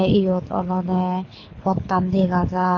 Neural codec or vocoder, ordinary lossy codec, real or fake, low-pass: codec, 24 kHz, 6 kbps, HILCodec; AAC, 32 kbps; fake; 7.2 kHz